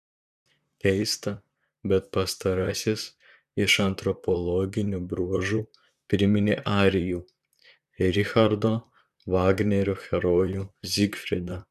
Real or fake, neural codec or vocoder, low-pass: fake; vocoder, 44.1 kHz, 128 mel bands, Pupu-Vocoder; 14.4 kHz